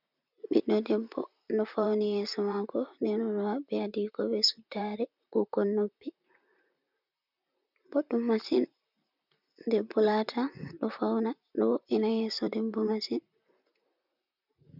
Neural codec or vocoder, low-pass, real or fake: vocoder, 44.1 kHz, 128 mel bands, Pupu-Vocoder; 5.4 kHz; fake